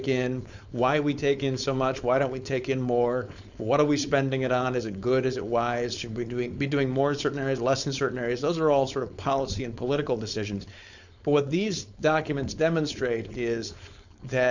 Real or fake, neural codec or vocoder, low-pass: fake; codec, 16 kHz, 4.8 kbps, FACodec; 7.2 kHz